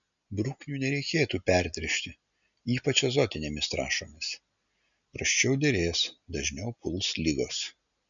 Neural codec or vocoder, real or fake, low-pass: none; real; 7.2 kHz